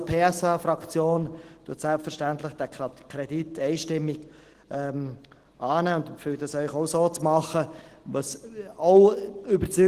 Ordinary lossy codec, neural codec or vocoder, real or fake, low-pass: Opus, 16 kbps; none; real; 14.4 kHz